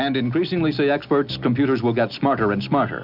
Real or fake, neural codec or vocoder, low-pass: real; none; 5.4 kHz